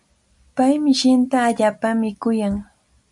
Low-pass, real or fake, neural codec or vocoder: 10.8 kHz; real; none